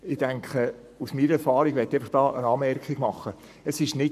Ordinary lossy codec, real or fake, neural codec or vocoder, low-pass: none; fake; codec, 44.1 kHz, 7.8 kbps, Pupu-Codec; 14.4 kHz